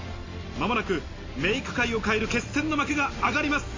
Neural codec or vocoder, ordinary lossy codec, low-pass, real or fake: none; AAC, 32 kbps; 7.2 kHz; real